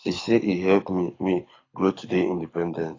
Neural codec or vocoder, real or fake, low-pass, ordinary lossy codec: codec, 24 kHz, 6 kbps, HILCodec; fake; 7.2 kHz; AAC, 32 kbps